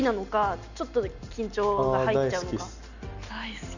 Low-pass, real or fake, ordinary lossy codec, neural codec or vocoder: 7.2 kHz; real; none; none